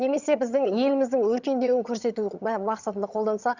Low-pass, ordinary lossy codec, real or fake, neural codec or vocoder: 7.2 kHz; Opus, 64 kbps; fake; vocoder, 22.05 kHz, 80 mel bands, HiFi-GAN